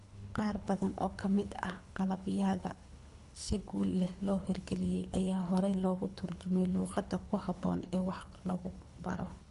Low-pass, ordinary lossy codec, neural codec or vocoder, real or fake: 10.8 kHz; none; codec, 24 kHz, 3 kbps, HILCodec; fake